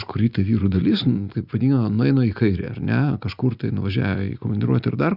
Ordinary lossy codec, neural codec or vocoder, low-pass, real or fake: Opus, 64 kbps; none; 5.4 kHz; real